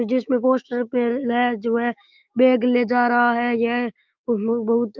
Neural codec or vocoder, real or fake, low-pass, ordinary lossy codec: autoencoder, 48 kHz, 128 numbers a frame, DAC-VAE, trained on Japanese speech; fake; 7.2 kHz; Opus, 24 kbps